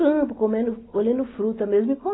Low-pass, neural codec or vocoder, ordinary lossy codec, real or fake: 7.2 kHz; none; AAC, 16 kbps; real